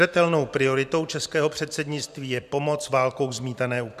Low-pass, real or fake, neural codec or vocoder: 14.4 kHz; fake; vocoder, 44.1 kHz, 128 mel bands every 512 samples, BigVGAN v2